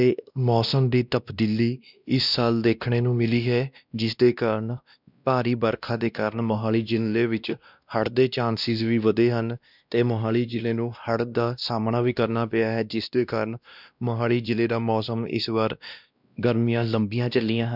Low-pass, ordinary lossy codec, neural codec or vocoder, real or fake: 5.4 kHz; none; codec, 16 kHz, 1 kbps, X-Codec, WavLM features, trained on Multilingual LibriSpeech; fake